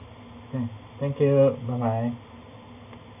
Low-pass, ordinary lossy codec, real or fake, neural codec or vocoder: 3.6 kHz; none; real; none